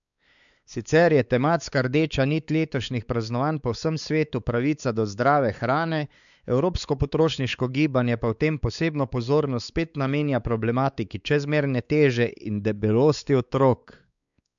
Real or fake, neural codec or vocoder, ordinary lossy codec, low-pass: fake; codec, 16 kHz, 4 kbps, X-Codec, WavLM features, trained on Multilingual LibriSpeech; none; 7.2 kHz